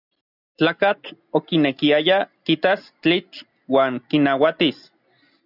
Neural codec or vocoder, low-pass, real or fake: none; 5.4 kHz; real